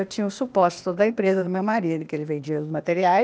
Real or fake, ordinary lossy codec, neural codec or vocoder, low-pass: fake; none; codec, 16 kHz, 0.8 kbps, ZipCodec; none